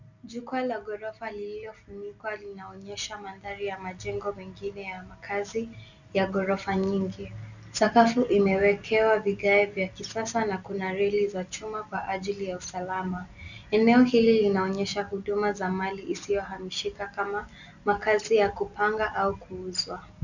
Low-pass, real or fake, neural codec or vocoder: 7.2 kHz; real; none